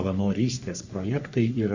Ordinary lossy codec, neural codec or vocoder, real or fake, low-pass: Opus, 64 kbps; codec, 44.1 kHz, 3.4 kbps, Pupu-Codec; fake; 7.2 kHz